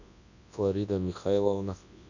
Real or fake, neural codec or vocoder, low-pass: fake; codec, 24 kHz, 0.9 kbps, WavTokenizer, large speech release; 7.2 kHz